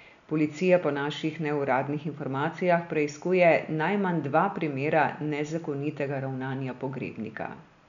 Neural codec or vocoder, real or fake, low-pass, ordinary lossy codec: none; real; 7.2 kHz; none